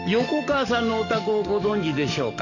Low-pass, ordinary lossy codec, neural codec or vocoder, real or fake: 7.2 kHz; none; codec, 44.1 kHz, 7.8 kbps, DAC; fake